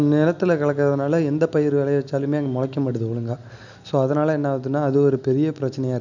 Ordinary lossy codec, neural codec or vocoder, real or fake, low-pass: none; none; real; 7.2 kHz